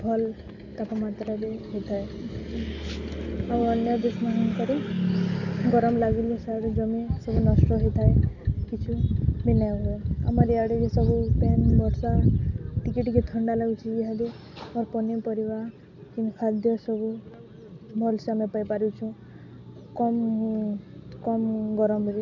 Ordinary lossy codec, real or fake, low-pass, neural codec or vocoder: none; real; 7.2 kHz; none